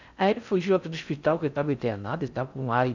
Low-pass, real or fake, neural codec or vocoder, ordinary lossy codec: 7.2 kHz; fake; codec, 16 kHz in and 24 kHz out, 0.6 kbps, FocalCodec, streaming, 4096 codes; none